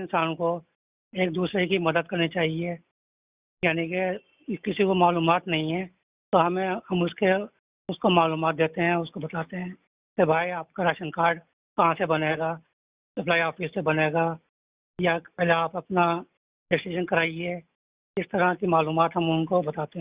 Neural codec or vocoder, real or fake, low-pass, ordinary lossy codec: none; real; 3.6 kHz; Opus, 64 kbps